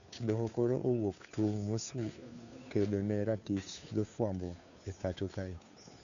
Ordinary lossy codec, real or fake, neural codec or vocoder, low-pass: none; fake; codec, 16 kHz, 2 kbps, FunCodec, trained on Chinese and English, 25 frames a second; 7.2 kHz